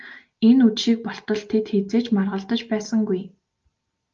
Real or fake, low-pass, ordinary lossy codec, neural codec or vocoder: real; 7.2 kHz; Opus, 24 kbps; none